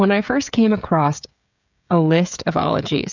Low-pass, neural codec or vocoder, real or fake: 7.2 kHz; codec, 16 kHz, 16 kbps, FreqCodec, smaller model; fake